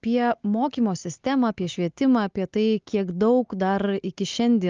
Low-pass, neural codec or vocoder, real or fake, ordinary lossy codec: 7.2 kHz; none; real; Opus, 24 kbps